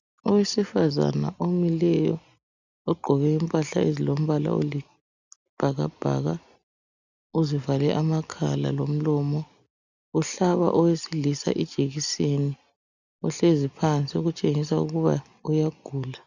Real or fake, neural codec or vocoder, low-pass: real; none; 7.2 kHz